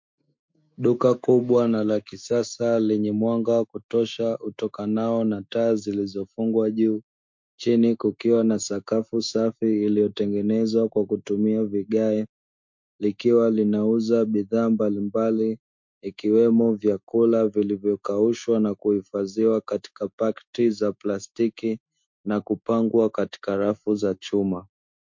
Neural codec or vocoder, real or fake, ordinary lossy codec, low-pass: autoencoder, 48 kHz, 128 numbers a frame, DAC-VAE, trained on Japanese speech; fake; MP3, 48 kbps; 7.2 kHz